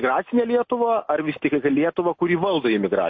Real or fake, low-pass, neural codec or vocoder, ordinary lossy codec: real; 7.2 kHz; none; MP3, 32 kbps